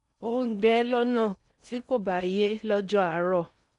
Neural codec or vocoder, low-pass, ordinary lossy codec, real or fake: codec, 16 kHz in and 24 kHz out, 0.6 kbps, FocalCodec, streaming, 4096 codes; 10.8 kHz; none; fake